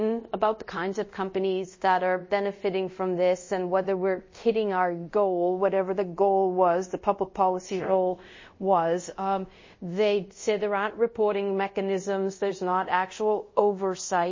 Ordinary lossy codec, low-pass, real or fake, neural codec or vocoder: MP3, 32 kbps; 7.2 kHz; fake; codec, 24 kHz, 0.5 kbps, DualCodec